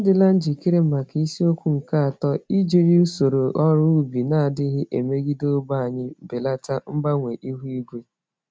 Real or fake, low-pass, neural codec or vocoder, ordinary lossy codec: real; none; none; none